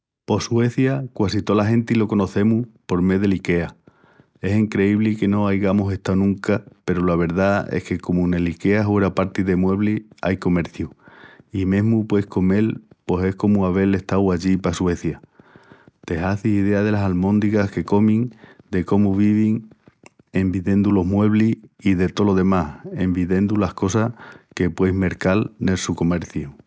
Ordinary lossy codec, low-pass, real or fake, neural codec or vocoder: none; none; real; none